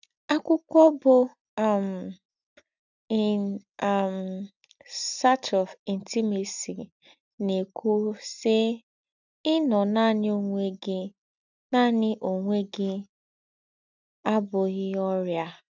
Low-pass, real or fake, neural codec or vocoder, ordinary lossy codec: 7.2 kHz; real; none; none